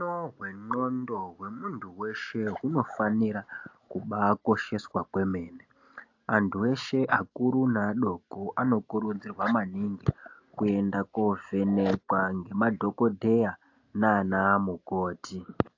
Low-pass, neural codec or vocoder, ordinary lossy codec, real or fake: 7.2 kHz; none; AAC, 48 kbps; real